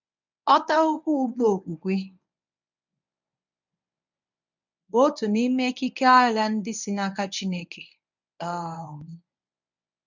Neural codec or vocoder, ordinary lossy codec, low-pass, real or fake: codec, 24 kHz, 0.9 kbps, WavTokenizer, medium speech release version 1; none; 7.2 kHz; fake